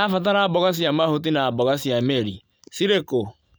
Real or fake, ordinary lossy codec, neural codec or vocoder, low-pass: real; none; none; none